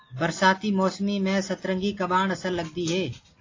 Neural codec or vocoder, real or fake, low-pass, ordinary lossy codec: none; real; 7.2 kHz; AAC, 32 kbps